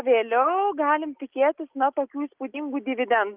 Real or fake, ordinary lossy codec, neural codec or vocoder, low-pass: real; Opus, 32 kbps; none; 3.6 kHz